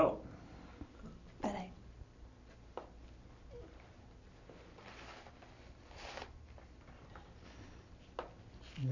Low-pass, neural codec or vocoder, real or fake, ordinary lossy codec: 7.2 kHz; none; real; none